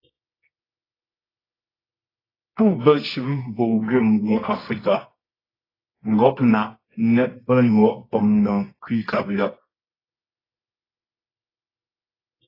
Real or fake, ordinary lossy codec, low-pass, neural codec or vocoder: fake; AAC, 24 kbps; 5.4 kHz; codec, 24 kHz, 0.9 kbps, WavTokenizer, medium music audio release